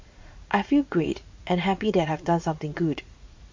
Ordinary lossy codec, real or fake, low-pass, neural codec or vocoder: MP3, 48 kbps; fake; 7.2 kHz; vocoder, 44.1 kHz, 80 mel bands, Vocos